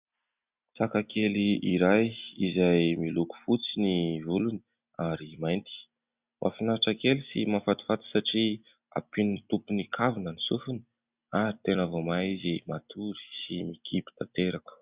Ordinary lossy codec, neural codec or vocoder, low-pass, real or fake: Opus, 64 kbps; none; 3.6 kHz; real